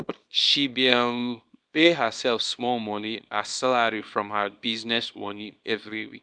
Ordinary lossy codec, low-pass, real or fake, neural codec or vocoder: none; 9.9 kHz; fake; codec, 24 kHz, 0.9 kbps, WavTokenizer, small release